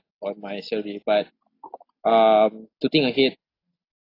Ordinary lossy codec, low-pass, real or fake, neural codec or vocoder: AAC, 32 kbps; 5.4 kHz; real; none